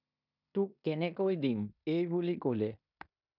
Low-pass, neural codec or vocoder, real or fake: 5.4 kHz; codec, 16 kHz in and 24 kHz out, 0.9 kbps, LongCat-Audio-Codec, fine tuned four codebook decoder; fake